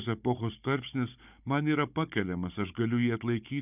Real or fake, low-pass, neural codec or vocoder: fake; 3.6 kHz; codec, 16 kHz, 16 kbps, FunCodec, trained on Chinese and English, 50 frames a second